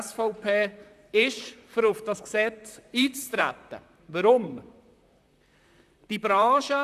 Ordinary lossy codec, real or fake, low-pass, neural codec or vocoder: none; fake; 14.4 kHz; vocoder, 44.1 kHz, 128 mel bands, Pupu-Vocoder